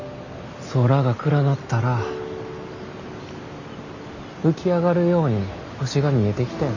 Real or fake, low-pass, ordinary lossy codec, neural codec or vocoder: real; 7.2 kHz; none; none